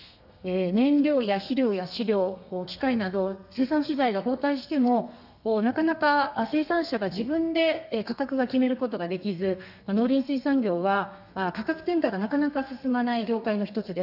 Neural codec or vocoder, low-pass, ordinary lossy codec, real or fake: codec, 32 kHz, 1.9 kbps, SNAC; 5.4 kHz; none; fake